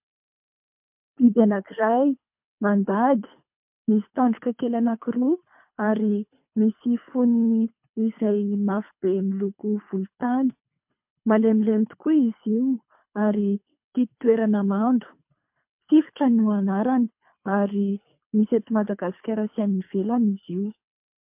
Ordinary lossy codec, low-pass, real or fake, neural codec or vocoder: MP3, 32 kbps; 3.6 kHz; fake; codec, 24 kHz, 3 kbps, HILCodec